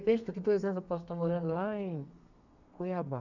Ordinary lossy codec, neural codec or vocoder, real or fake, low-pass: none; codec, 32 kHz, 1.9 kbps, SNAC; fake; 7.2 kHz